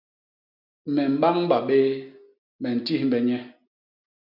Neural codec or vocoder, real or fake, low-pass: none; real; 5.4 kHz